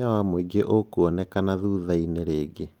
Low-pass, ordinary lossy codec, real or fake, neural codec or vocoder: 19.8 kHz; Opus, 24 kbps; real; none